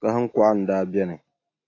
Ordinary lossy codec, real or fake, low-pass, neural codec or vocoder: AAC, 32 kbps; real; 7.2 kHz; none